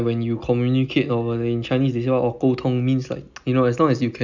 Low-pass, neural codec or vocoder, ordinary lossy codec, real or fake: 7.2 kHz; none; none; real